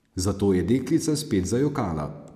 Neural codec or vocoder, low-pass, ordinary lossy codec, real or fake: none; 14.4 kHz; AAC, 96 kbps; real